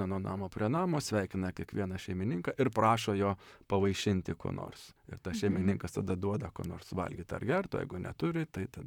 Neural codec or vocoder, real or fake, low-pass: vocoder, 44.1 kHz, 128 mel bands, Pupu-Vocoder; fake; 19.8 kHz